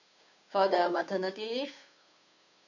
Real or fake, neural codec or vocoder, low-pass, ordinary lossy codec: fake; codec, 16 kHz, 2 kbps, FunCodec, trained on Chinese and English, 25 frames a second; 7.2 kHz; none